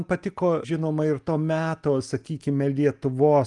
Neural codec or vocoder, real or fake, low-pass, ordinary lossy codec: none; real; 10.8 kHz; Opus, 32 kbps